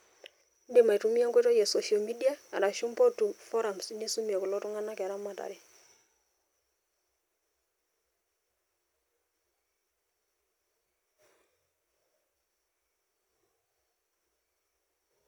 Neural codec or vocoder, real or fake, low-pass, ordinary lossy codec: none; real; none; none